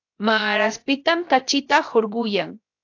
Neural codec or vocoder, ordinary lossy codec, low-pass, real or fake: codec, 16 kHz, 0.7 kbps, FocalCodec; AAC, 48 kbps; 7.2 kHz; fake